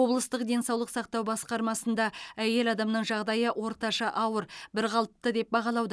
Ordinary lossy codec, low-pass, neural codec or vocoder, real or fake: none; none; none; real